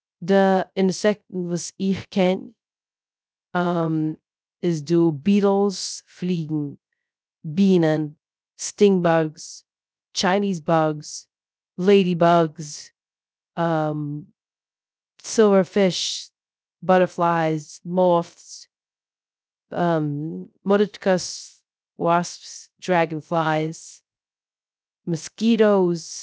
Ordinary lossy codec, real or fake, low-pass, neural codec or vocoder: none; fake; none; codec, 16 kHz, 0.3 kbps, FocalCodec